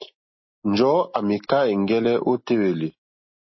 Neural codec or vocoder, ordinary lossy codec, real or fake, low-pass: none; MP3, 24 kbps; real; 7.2 kHz